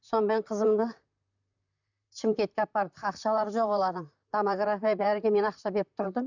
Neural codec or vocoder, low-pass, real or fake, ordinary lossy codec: vocoder, 44.1 kHz, 128 mel bands, Pupu-Vocoder; 7.2 kHz; fake; none